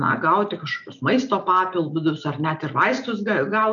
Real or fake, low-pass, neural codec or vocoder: real; 7.2 kHz; none